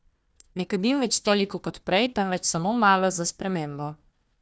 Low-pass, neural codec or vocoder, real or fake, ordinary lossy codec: none; codec, 16 kHz, 1 kbps, FunCodec, trained on Chinese and English, 50 frames a second; fake; none